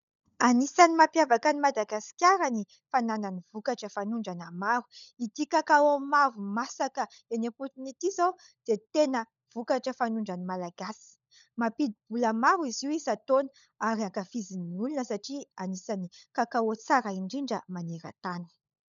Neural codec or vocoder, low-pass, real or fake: codec, 16 kHz, 16 kbps, FunCodec, trained on LibriTTS, 50 frames a second; 7.2 kHz; fake